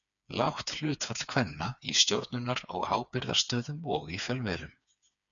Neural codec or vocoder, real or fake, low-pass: codec, 16 kHz, 4 kbps, FreqCodec, smaller model; fake; 7.2 kHz